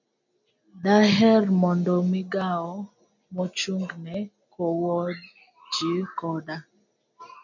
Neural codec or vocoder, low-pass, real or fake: none; 7.2 kHz; real